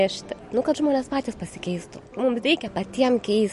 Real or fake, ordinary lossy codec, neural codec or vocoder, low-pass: real; MP3, 48 kbps; none; 14.4 kHz